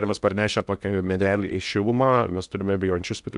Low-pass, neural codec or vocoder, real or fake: 10.8 kHz; codec, 16 kHz in and 24 kHz out, 0.8 kbps, FocalCodec, streaming, 65536 codes; fake